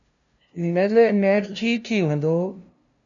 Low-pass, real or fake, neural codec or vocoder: 7.2 kHz; fake; codec, 16 kHz, 0.5 kbps, FunCodec, trained on LibriTTS, 25 frames a second